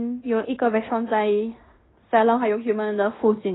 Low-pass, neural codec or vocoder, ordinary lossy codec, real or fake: 7.2 kHz; codec, 16 kHz in and 24 kHz out, 0.9 kbps, LongCat-Audio-Codec, fine tuned four codebook decoder; AAC, 16 kbps; fake